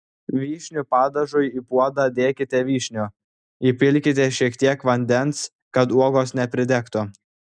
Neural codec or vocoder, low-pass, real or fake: none; 9.9 kHz; real